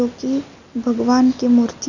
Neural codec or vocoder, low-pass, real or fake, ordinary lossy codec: none; 7.2 kHz; real; none